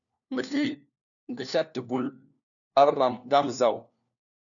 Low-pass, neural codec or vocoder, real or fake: 7.2 kHz; codec, 16 kHz, 1 kbps, FunCodec, trained on LibriTTS, 50 frames a second; fake